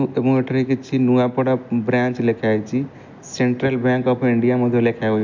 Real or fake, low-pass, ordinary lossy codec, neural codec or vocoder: real; 7.2 kHz; MP3, 64 kbps; none